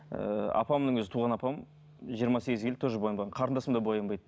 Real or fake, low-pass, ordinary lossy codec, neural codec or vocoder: real; none; none; none